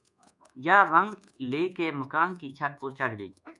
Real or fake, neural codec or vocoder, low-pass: fake; codec, 24 kHz, 1.2 kbps, DualCodec; 10.8 kHz